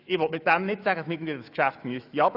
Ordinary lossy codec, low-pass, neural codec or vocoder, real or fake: none; 5.4 kHz; autoencoder, 48 kHz, 128 numbers a frame, DAC-VAE, trained on Japanese speech; fake